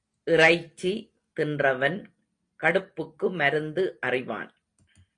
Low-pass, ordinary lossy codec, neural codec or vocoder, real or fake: 9.9 kHz; AAC, 48 kbps; none; real